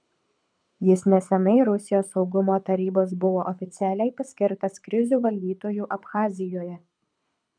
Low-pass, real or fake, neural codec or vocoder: 9.9 kHz; fake; codec, 24 kHz, 6 kbps, HILCodec